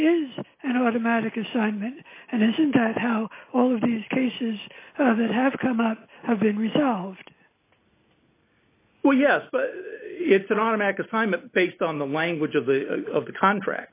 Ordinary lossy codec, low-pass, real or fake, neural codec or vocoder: AAC, 24 kbps; 3.6 kHz; real; none